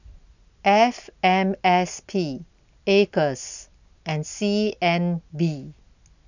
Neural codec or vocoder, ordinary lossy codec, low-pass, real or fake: none; none; 7.2 kHz; real